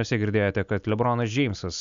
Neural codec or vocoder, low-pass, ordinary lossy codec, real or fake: none; 7.2 kHz; MP3, 96 kbps; real